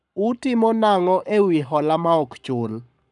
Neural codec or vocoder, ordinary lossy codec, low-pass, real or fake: codec, 44.1 kHz, 7.8 kbps, Pupu-Codec; none; 10.8 kHz; fake